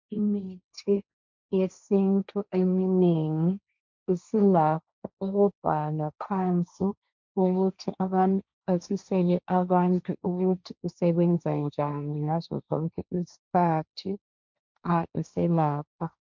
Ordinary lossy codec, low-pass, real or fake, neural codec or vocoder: MP3, 64 kbps; 7.2 kHz; fake; codec, 16 kHz, 1.1 kbps, Voila-Tokenizer